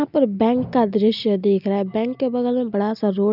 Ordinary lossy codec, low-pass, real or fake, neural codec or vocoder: none; 5.4 kHz; real; none